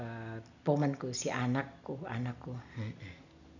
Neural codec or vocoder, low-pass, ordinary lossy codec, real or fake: none; 7.2 kHz; none; real